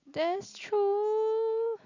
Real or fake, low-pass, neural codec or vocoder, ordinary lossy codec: real; 7.2 kHz; none; none